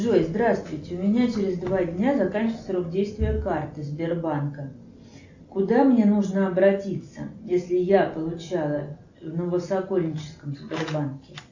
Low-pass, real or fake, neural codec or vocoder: 7.2 kHz; real; none